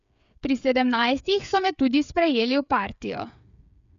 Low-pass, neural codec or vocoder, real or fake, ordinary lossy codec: 7.2 kHz; codec, 16 kHz, 8 kbps, FreqCodec, smaller model; fake; none